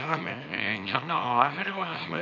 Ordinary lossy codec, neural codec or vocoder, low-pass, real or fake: none; codec, 24 kHz, 0.9 kbps, WavTokenizer, small release; 7.2 kHz; fake